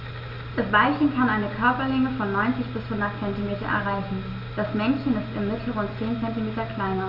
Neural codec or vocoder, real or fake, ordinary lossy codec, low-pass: none; real; none; 5.4 kHz